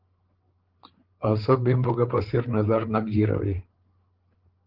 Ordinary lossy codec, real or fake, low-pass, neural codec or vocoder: Opus, 16 kbps; fake; 5.4 kHz; codec, 16 kHz, 8 kbps, FreqCodec, larger model